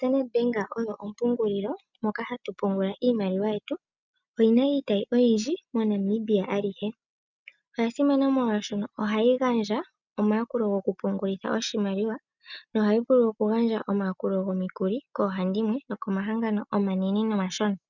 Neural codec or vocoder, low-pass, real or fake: none; 7.2 kHz; real